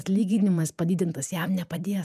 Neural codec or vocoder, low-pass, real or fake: vocoder, 48 kHz, 128 mel bands, Vocos; 14.4 kHz; fake